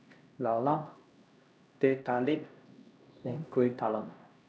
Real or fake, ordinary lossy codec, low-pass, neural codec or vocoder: fake; none; none; codec, 16 kHz, 1 kbps, X-Codec, HuBERT features, trained on LibriSpeech